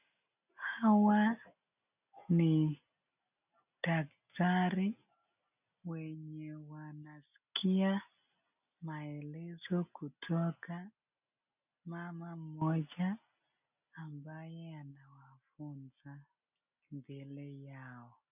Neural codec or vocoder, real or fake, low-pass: none; real; 3.6 kHz